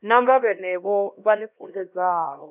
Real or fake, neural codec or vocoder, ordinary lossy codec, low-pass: fake; codec, 16 kHz, 1 kbps, X-Codec, HuBERT features, trained on LibriSpeech; none; 3.6 kHz